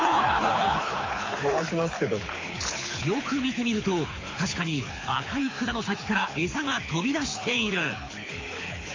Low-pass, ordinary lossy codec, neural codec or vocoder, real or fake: 7.2 kHz; AAC, 32 kbps; codec, 24 kHz, 6 kbps, HILCodec; fake